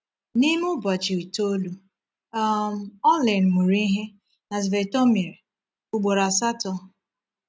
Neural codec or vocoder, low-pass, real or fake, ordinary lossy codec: none; none; real; none